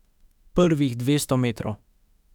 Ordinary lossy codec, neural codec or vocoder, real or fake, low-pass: none; autoencoder, 48 kHz, 32 numbers a frame, DAC-VAE, trained on Japanese speech; fake; 19.8 kHz